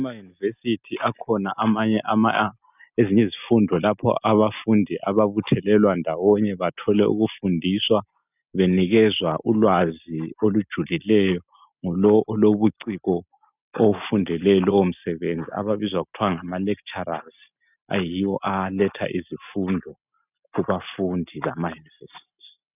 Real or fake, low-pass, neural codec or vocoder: real; 3.6 kHz; none